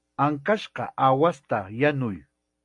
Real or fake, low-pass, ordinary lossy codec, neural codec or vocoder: real; 10.8 kHz; MP3, 64 kbps; none